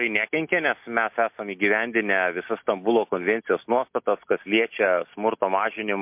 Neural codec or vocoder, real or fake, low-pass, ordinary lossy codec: none; real; 3.6 kHz; MP3, 32 kbps